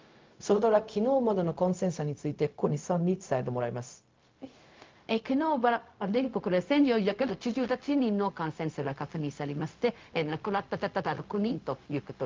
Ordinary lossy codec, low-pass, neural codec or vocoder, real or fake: Opus, 32 kbps; 7.2 kHz; codec, 16 kHz, 0.4 kbps, LongCat-Audio-Codec; fake